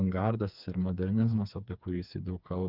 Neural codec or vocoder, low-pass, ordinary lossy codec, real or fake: codec, 16 kHz, 4 kbps, FreqCodec, smaller model; 5.4 kHz; Opus, 24 kbps; fake